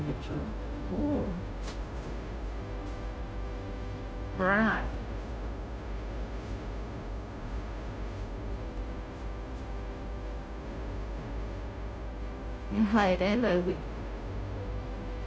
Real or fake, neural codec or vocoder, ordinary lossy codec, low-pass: fake; codec, 16 kHz, 0.5 kbps, FunCodec, trained on Chinese and English, 25 frames a second; none; none